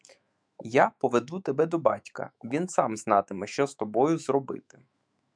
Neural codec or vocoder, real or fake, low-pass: autoencoder, 48 kHz, 128 numbers a frame, DAC-VAE, trained on Japanese speech; fake; 9.9 kHz